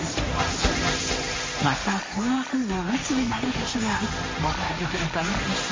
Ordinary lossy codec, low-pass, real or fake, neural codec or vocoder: MP3, 32 kbps; 7.2 kHz; fake; codec, 16 kHz, 1.1 kbps, Voila-Tokenizer